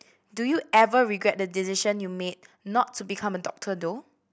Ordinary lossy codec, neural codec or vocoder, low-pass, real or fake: none; none; none; real